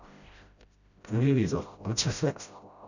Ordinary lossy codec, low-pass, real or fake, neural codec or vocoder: none; 7.2 kHz; fake; codec, 16 kHz, 0.5 kbps, FreqCodec, smaller model